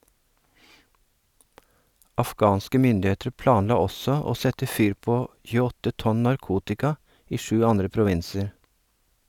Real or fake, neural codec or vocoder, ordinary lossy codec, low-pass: real; none; none; 19.8 kHz